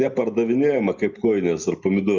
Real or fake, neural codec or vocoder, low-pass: real; none; 7.2 kHz